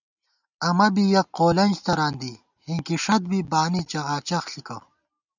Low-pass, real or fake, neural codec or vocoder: 7.2 kHz; real; none